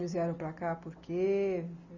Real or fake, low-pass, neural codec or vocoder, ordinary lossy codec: real; 7.2 kHz; none; none